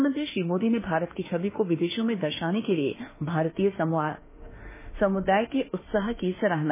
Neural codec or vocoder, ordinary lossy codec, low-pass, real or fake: autoencoder, 48 kHz, 32 numbers a frame, DAC-VAE, trained on Japanese speech; MP3, 16 kbps; 3.6 kHz; fake